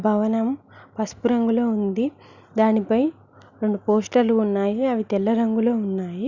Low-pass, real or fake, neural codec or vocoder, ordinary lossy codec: 7.2 kHz; real; none; Opus, 64 kbps